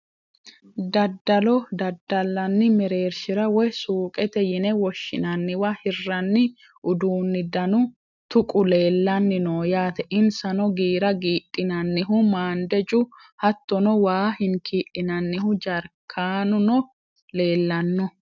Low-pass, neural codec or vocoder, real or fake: 7.2 kHz; none; real